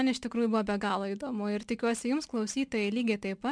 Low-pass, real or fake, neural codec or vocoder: 9.9 kHz; real; none